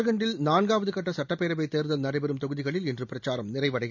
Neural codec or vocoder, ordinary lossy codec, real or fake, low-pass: none; none; real; 7.2 kHz